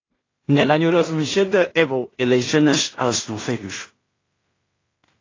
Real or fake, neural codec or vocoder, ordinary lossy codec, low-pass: fake; codec, 16 kHz in and 24 kHz out, 0.4 kbps, LongCat-Audio-Codec, two codebook decoder; AAC, 32 kbps; 7.2 kHz